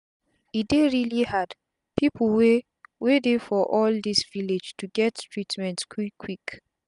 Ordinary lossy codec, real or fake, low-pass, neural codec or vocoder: none; real; 10.8 kHz; none